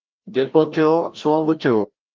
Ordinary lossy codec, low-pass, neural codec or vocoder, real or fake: Opus, 32 kbps; 7.2 kHz; codec, 16 kHz, 1 kbps, FreqCodec, larger model; fake